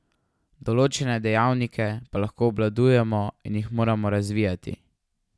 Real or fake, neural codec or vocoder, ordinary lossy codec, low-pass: real; none; none; none